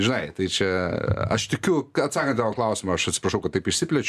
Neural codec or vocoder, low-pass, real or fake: none; 14.4 kHz; real